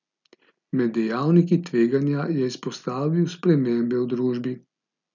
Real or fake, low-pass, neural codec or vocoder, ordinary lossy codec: real; 7.2 kHz; none; none